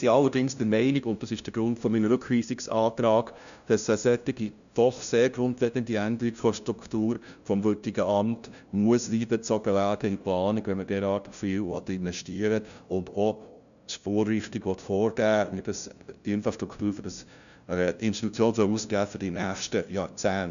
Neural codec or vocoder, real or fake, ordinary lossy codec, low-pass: codec, 16 kHz, 0.5 kbps, FunCodec, trained on LibriTTS, 25 frames a second; fake; none; 7.2 kHz